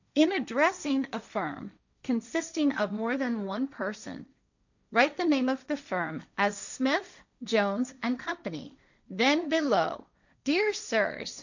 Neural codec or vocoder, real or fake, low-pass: codec, 16 kHz, 1.1 kbps, Voila-Tokenizer; fake; 7.2 kHz